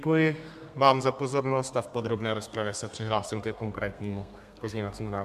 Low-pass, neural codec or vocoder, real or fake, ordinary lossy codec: 14.4 kHz; codec, 32 kHz, 1.9 kbps, SNAC; fake; MP3, 96 kbps